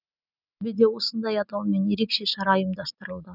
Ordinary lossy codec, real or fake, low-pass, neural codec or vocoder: none; real; 5.4 kHz; none